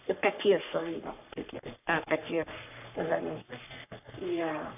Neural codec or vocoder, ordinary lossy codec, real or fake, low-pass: codec, 44.1 kHz, 3.4 kbps, Pupu-Codec; AAC, 32 kbps; fake; 3.6 kHz